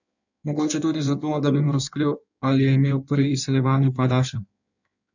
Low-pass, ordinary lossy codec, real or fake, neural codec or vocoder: 7.2 kHz; none; fake; codec, 16 kHz in and 24 kHz out, 1.1 kbps, FireRedTTS-2 codec